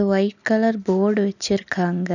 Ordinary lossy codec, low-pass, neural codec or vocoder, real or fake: none; 7.2 kHz; none; real